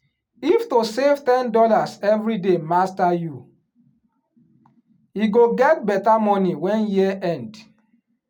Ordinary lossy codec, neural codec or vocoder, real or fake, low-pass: none; none; real; 19.8 kHz